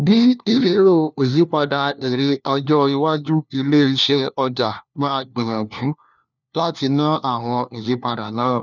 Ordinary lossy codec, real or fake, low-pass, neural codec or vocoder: none; fake; 7.2 kHz; codec, 16 kHz, 1 kbps, FunCodec, trained on LibriTTS, 50 frames a second